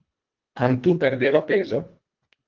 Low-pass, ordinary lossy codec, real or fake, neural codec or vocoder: 7.2 kHz; Opus, 32 kbps; fake; codec, 24 kHz, 1.5 kbps, HILCodec